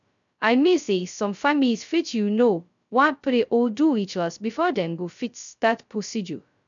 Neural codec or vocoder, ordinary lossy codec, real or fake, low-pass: codec, 16 kHz, 0.2 kbps, FocalCodec; none; fake; 7.2 kHz